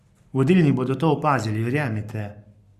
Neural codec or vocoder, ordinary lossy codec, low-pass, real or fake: codec, 44.1 kHz, 7.8 kbps, Pupu-Codec; Opus, 64 kbps; 14.4 kHz; fake